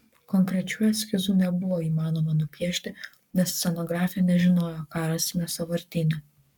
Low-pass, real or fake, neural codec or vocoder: 19.8 kHz; fake; codec, 44.1 kHz, 7.8 kbps, Pupu-Codec